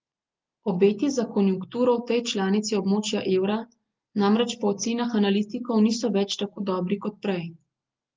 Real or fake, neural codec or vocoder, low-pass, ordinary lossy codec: real; none; 7.2 kHz; Opus, 32 kbps